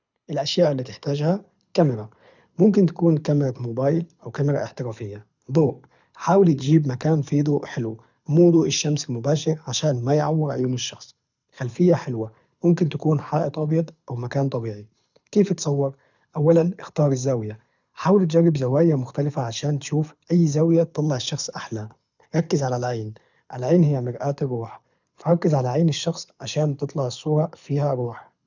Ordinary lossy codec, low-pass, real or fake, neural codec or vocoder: none; 7.2 kHz; fake; codec, 24 kHz, 6 kbps, HILCodec